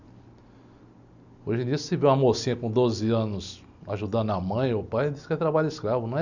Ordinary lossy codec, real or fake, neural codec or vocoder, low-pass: none; real; none; 7.2 kHz